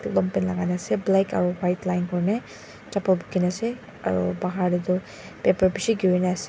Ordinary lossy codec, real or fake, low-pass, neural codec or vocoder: none; real; none; none